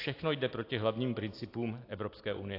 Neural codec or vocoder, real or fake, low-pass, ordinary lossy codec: none; real; 5.4 kHz; AAC, 32 kbps